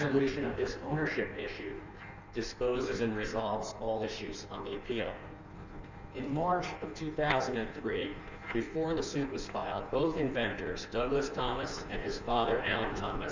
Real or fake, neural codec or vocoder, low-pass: fake; codec, 16 kHz in and 24 kHz out, 1.1 kbps, FireRedTTS-2 codec; 7.2 kHz